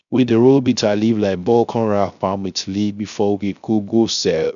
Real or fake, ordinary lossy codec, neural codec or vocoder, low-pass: fake; none; codec, 16 kHz, 0.3 kbps, FocalCodec; 7.2 kHz